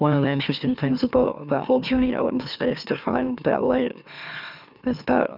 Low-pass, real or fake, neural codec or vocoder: 5.4 kHz; fake; autoencoder, 44.1 kHz, a latent of 192 numbers a frame, MeloTTS